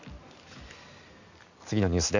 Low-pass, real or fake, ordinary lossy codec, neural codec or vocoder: 7.2 kHz; real; none; none